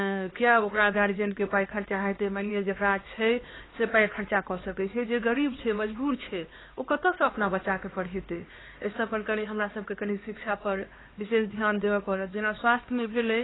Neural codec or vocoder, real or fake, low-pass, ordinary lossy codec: codec, 16 kHz, 2 kbps, X-Codec, HuBERT features, trained on LibriSpeech; fake; 7.2 kHz; AAC, 16 kbps